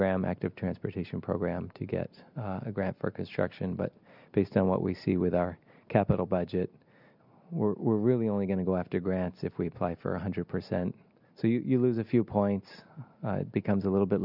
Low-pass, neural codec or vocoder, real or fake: 5.4 kHz; none; real